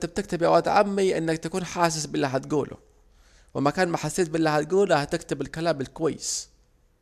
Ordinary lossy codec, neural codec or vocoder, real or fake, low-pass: MP3, 96 kbps; none; real; 14.4 kHz